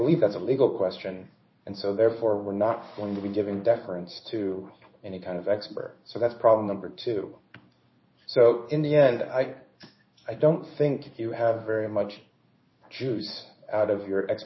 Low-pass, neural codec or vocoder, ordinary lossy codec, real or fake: 7.2 kHz; codec, 16 kHz in and 24 kHz out, 1 kbps, XY-Tokenizer; MP3, 24 kbps; fake